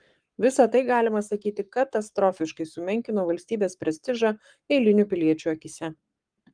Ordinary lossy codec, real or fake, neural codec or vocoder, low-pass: Opus, 32 kbps; fake; codec, 44.1 kHz, 7.8 kbps, Pupu-Codec; 9.9 kHz